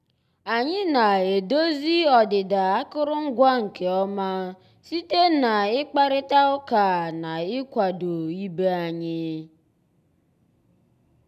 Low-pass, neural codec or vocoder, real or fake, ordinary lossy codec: 14.4 kHz; none; real; none